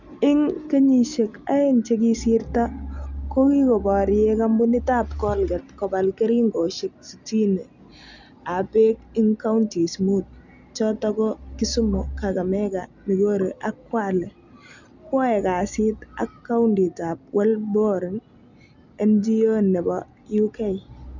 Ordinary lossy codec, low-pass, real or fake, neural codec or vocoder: none; 7.2 kHz; real; none